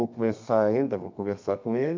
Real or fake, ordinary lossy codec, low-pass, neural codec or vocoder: fake; MP3, 64 kbps; 7.2 kHz; codec, 32 kHz, 1.9 kbps, SNAC